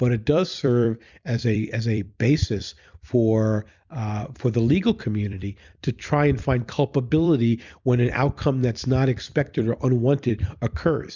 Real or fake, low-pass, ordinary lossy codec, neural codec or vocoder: fake; 7.2 kHz; Opus, 64 kbps; vocoder, 44.1 kHz, 128 mel bands every 256 samples, BigVGAN v2